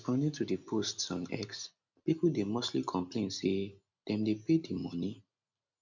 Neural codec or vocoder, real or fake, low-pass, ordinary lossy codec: none; real; 7.2 kHz; none